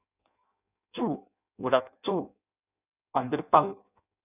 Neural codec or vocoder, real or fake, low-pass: codec, 16 kHz in and 24 kHz out, 0.6 kbps, FireRedTTS-2 codec; fake; 3.6 kHz